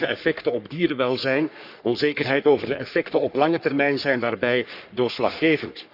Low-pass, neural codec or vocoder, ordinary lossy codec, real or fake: 5.4 kHz; codec, 44.1 kHz, 3.4 kbps, Pupu-Codec; none; fake